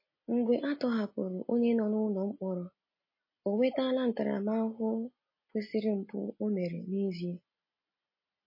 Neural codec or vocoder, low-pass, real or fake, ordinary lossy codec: none; 5.4 kHz; real; MP3, 24 kbps